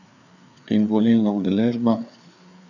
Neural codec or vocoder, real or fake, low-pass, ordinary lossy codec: codec, 16 kHz, 4 kbps, FreqCodec, larger model; fake; 7.2 kHz; none